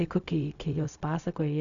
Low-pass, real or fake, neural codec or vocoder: 7.2 kHz; fake; codec, 16 kHz, 0.4 kbps, LongCat-Audio-Codec